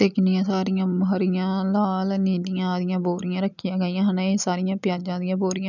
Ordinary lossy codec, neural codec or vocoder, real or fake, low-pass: none; none; real; 7.2 kHz